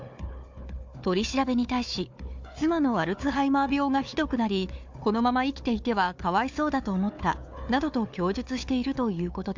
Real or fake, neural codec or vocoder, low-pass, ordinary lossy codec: fake; codec, 16 kHz, 4 kbps, FunCodec, trained on Chinese and English, 50 frames a second; 7.2 kHz; MP3, 64 kbps